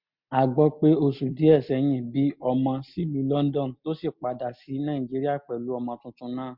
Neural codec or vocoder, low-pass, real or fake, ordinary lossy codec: none; 5.4 kHz; real; MP3, 48 kbps